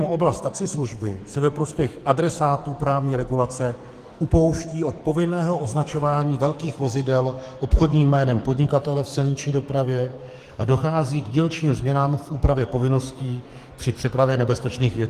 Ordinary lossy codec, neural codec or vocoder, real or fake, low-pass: Opus, 24 kbps; codec, 44.1 kHz, 2.6 kbps, SNAC; fake; 14.4 kHz